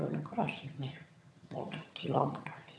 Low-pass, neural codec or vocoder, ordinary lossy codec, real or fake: none; vocoder, 22.05 kHz, 80 mel bands, HiFi-GAN; none; fake